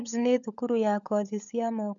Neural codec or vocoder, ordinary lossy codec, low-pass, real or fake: codec, 16 kHz, 16 kbps, FunCodec, trained on LibriTTS, 50 frames a second; none; 7.2 kHz; fake